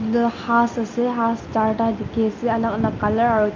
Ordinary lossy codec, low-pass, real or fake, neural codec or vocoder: Opus, 32 kbps; 7.2 kHz; real; none